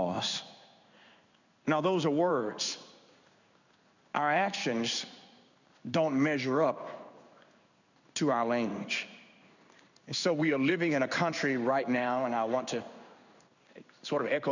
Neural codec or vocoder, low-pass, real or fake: codec, 16 kHz in and 24 kHz out, 1 kbps, XY-Tokenizer; 7.2 kHz; fake